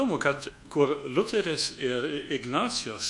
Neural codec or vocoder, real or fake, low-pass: codec, 24 kHz, 1.2 kbps, DualCodec; fake; 10.8 kHz